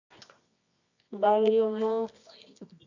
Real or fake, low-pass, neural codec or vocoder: fake; 7.2 kHz; codec, 24 kHz, 0.9 kbps, WavTokenizer, medium music audio release